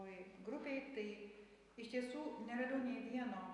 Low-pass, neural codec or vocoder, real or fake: 10.8 kHz; none; real